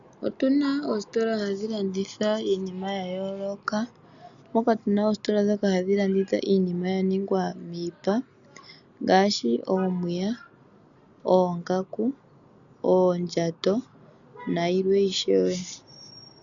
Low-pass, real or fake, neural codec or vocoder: 7.2 kHz; real; none